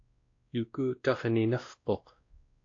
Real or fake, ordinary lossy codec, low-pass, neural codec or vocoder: fake; AAC, 48 kbps; 7.2 kHz; codec, 16 kHz, 1 kbps, X-Codec, WavLM features, trained on Multilingual LibriSpeech